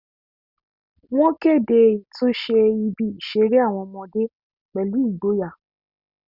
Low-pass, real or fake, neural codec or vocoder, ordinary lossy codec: 5.4 kHz; real; none; Opus, 64 kbps